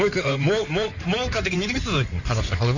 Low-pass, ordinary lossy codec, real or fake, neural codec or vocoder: 7.2 kHz; none; fake; codec, 16 kHz in and 24 kHz out, 2.2 kbps, FireRedTTS-2 codec